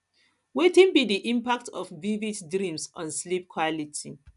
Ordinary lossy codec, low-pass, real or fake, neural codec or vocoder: none; 10.8 kHz; real; none